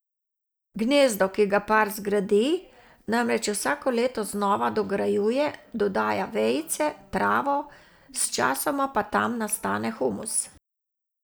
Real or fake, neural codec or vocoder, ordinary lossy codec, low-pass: real; none; none; none